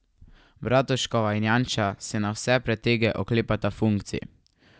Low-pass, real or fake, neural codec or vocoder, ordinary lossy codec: none; real; none; none